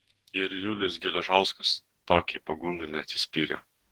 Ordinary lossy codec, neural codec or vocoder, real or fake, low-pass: Opus, 16 kbps; codec, 44.1 kHz, 2.6 kbps, DAC; fake; 19.8 kHz